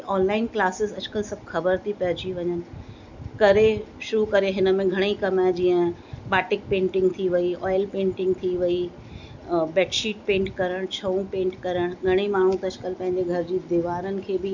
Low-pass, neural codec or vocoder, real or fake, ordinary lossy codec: 7.2 kHz; none; real; none